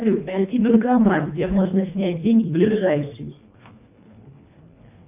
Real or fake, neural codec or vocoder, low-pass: fake; codec, 24 kHz, 1.5 kbps, HILCodec; 3.6 kHz